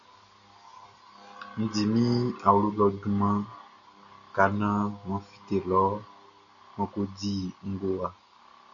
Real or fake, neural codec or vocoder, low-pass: real; none; 7.2 kHz